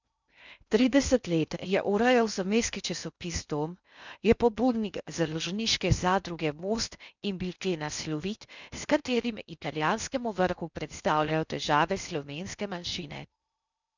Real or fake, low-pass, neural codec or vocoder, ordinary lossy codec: fake; 7.2 kHz; codec, 16 kHz in and 24 kHz out, 0.6 kbps, FocalCodec, streaming, 2048 codes; none